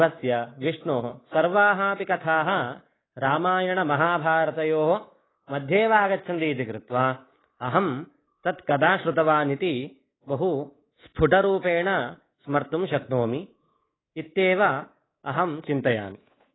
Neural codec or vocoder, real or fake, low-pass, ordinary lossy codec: codec, 24 kHz, 3.1 kbps, DualCodec; fake; 7.2 kHz; AAC, 16 kbps